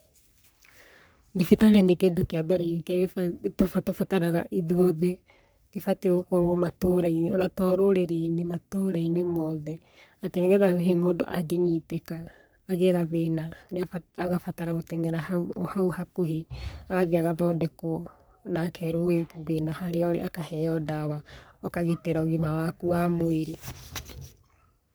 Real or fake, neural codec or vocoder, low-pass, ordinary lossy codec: fake; codec, 44.1 kHz, 3.4 kbps, Pupu-Codec; none; none